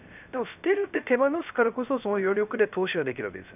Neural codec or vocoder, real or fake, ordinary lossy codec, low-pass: codec, 16 kHz, 0.3 kbps, FocalCodec; fake; none; 3.6 kHz